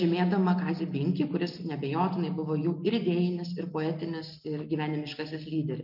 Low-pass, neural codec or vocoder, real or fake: 5.4 kHz; none; real